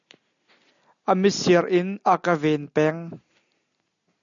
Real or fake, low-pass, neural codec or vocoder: real; 7.2 kHz; none